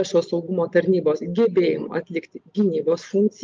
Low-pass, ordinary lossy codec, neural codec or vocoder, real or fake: 7.2 kHz; Opus, 24 kbps; none; real